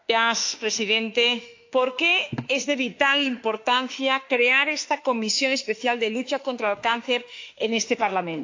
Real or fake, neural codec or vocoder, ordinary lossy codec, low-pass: fake; autoencoder, 48 kHz, 32 numbers a frame, DAC-VAE, trained on Japanese speech; AAC, 48 kbps; 7.2 kHz